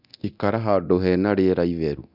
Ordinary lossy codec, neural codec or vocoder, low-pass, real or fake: none; codec, 24 kHz, 0.9 kbps, DualCodec; 5.4 kHz; fake